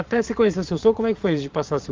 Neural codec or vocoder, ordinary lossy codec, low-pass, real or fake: vocoder, 22.05 kHz, 80 mel bands, Vocos; Opus, 32 kbps; 7.2 kHz; fake